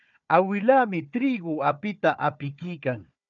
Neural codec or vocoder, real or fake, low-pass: codec, 16 kHz, 4 kbps, FunCodec, trained on Chinese and English, 50 frames a second; fake; 7.2 kHz